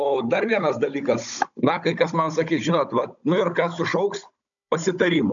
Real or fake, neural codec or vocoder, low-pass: fake; codec, 16 kHz, 16 kbps, FunCodec, trained on Chinese and English, 50 frames a second; 7.2 kHz